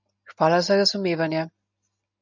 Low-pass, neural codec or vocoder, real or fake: 7.2 kHz; none; real